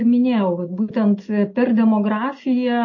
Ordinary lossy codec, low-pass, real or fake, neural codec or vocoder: MP3, 32 kbps; 7.2 kHz; real; none